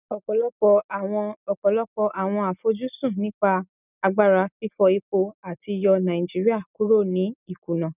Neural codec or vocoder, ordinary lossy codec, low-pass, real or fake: none; none; 3.6 kHz; real